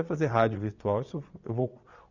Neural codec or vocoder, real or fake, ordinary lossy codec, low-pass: vocoder, 22.05 kHz, 80 mel bands, Vocos; fake; none; 7.2 kHz